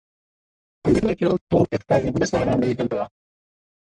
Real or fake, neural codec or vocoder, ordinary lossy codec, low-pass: fake; codec, 44.1 kHz, 1.7 kbps, Pupu-Codec; MP3, 96 kbps; 9.9 kHz